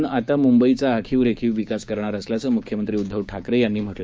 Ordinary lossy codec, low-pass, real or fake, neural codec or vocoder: none; none; fake; codec, 16 kHz, 6 kbps, DAC